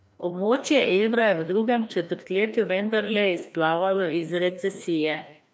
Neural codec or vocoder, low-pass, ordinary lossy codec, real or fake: codec, 16 kHz, 1 kbps, FreqCodec, larger model; none; none; fake